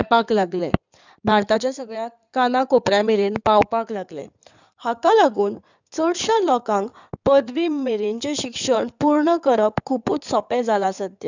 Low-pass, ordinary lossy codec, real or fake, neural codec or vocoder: 7.2 kHz; none; fake; codec, 16 kHz in and 24 kHz out, 2.2 kbps, FireRedTTS-2 codec